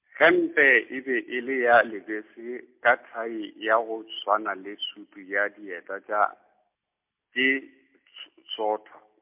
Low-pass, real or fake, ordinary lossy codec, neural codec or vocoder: 3.6 kHz; real; MP3, 32 kbps; none